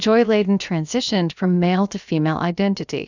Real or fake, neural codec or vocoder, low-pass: fake; codec, 16 kHz, about 1 kbps, DyCAST, with the encoder's durations; 7.2 kHz